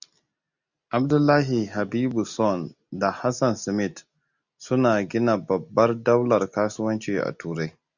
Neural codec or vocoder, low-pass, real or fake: none; 7.2 kHz; real